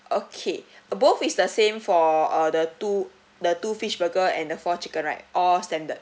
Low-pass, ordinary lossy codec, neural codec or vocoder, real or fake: none; none; none; real